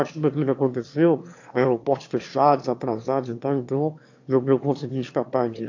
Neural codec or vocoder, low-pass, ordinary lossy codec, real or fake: autoencoder, 22.05 kHz, a latent of 192 numbers a frame, VITS, trained on one speaker; 7.2 kHz; AAC, 48 kbps; fake